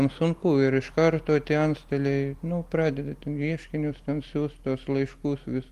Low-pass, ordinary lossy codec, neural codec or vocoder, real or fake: 14.4 kHz; Opus, 24 kbps; none; real